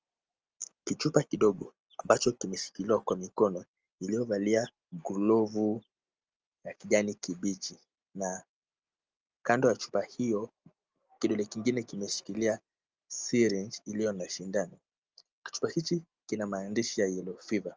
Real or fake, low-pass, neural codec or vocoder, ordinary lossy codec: real; 7.2 kHz; none; Opus, 24 kbps